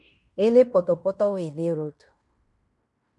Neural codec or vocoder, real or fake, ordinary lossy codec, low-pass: codec, 16 kHz in and 24 kHz out, 0.9 kbps, LongCat-Audio-Codec, fine tuned four codebook decoder; fake; MP3, 64 kbps; 10.8 kHz